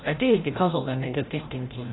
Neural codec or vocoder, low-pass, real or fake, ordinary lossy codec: codec, 16 kHz, 0.5 kbps, FreqCodec, larger model; 7.2 kHz; fake; AAC, 16 kbps